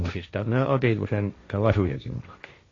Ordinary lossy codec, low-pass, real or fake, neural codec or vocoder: AAC, 32 kbps; 7.2 kHz; fake; codec, 16 kHz, 1.1 kbps, Voila-Tokenizer